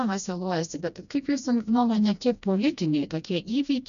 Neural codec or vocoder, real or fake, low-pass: codec, 16 kHz, 1 kbps, FreqCodec, smaller model; fake; 7.2 kHz